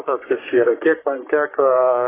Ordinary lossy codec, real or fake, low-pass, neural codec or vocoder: AAC, 16 kbps; fake; 3.6 kHz; codec, 44.1 kHz, 3.4 kbps, Pupu-Codec